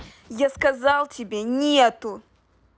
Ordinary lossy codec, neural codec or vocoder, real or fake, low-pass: none; none; real; none